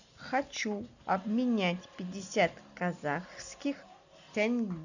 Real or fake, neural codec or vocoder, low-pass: real; none; 7.2 kHz